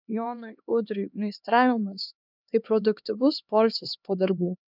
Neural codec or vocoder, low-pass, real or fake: codec, 16 kHz, 2 kbps, X-Codec, HuBERT features, trained on LibriSpeech; 5.4 kHz; fake